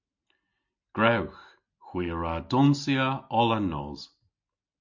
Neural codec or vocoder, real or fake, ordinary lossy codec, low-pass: none; real; MP3, 48 kbps; 7.2 kHz